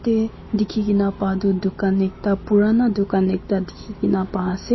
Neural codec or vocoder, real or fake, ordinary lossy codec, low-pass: none; real; MP3, 24 kbps; 7.2 kHz